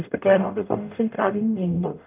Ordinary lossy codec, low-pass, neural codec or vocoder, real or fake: none; 3.6 kHz; codec, 44.1 kHz, 0.9 kbps, DAC; fake